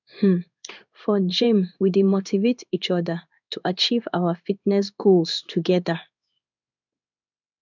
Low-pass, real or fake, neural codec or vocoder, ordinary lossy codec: 7.2 kHz; fake; codec, 16 kHz in and 24 kHz out, 1 kbps, XY-Tokenizer; none